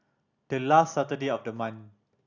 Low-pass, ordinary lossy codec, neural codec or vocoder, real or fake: 7.2 kHz; none; none; real